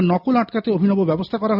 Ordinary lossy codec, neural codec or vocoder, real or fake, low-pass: none; none; real; 5.4 kHz